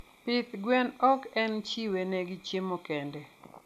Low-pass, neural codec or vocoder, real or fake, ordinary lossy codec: 14.4 kHz; none; real; none